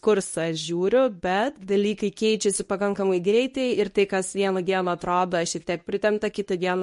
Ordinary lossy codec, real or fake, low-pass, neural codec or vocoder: MP3, 48 kbps; fake; 10.8 kHz; codec, 24 kHz, 0.9 kbps, WavTokenizer, medium speech release version 1